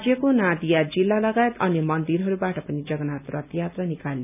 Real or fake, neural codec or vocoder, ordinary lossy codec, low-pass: real; none; none; 3.6 kHz